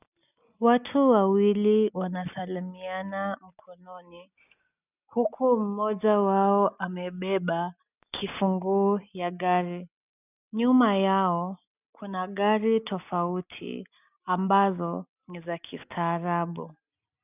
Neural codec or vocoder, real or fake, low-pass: none; real; 3.6 kHz